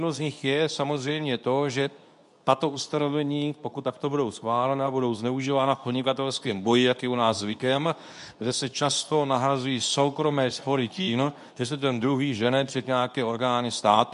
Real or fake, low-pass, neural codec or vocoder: fake; 10.8 kHz; codec, 24 kHz, 0.9 kbps, WavTokenizer, medium speech release version 2